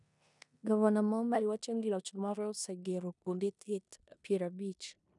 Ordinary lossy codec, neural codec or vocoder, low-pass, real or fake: none; codec, 16 kHz in and 24 kHz out, 0.9 kbps, LongCat-Audio-Codec, four codebook decoder; 10.8 kHz; fake